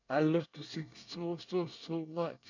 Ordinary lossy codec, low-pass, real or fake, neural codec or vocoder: none; 7.2 kHz; fake; codec, 24 kHz, 1 kbps, SNAC